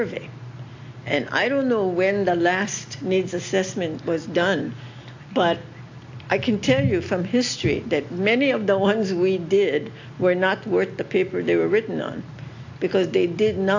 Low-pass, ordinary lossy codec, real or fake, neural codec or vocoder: 7.2 kHz; AAC, 48 kbps; real; none